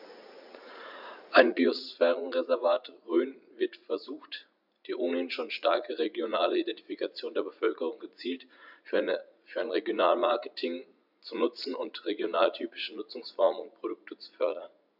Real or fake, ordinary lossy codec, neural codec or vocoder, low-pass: fake; none; vocoder, 44.1 kHz, 80 mel bands, Vocos; 5.4 kHz